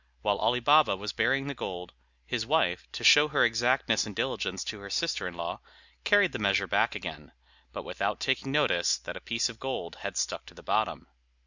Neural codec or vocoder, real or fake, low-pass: none; real; 7.2 kHz